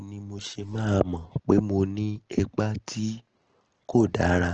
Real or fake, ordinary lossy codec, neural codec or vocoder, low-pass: real; Opus, 16 kbps; none; 7.2 kHz